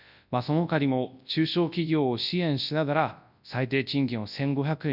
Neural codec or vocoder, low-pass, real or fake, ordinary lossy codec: codec, 24 kHz, 0.9 kbps, WavTokenizer, large speech release; 5.4 kHz; fake; none